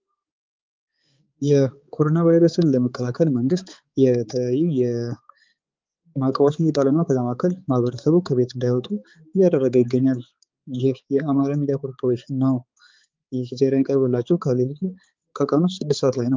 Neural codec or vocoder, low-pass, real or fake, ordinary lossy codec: codec, 16 kHz, 4 kbps, X-Codec, HuBERT features, trained on balanced general audio; 7.2 kHz; fake; Opus, 32 kbps